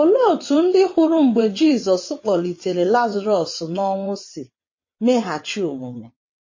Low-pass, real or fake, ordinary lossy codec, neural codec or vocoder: 7.2 kHz; fake; MP3, 32 kbps; vocoder, 22.05 kHz, 80 mel bands, WaveNeXt